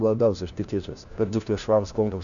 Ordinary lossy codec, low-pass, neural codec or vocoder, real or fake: MP3, 96 kbps; 7.2 kHz; codec, 16 kHz, 1 kbps, FunCodec, trained on LibriTTS, 50 frames a second; fake